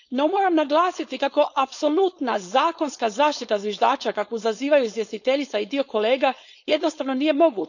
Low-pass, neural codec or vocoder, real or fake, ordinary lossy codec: 7.2 kHz; codec, 16 kHz, 4.8 kbps, FACodec; fake; none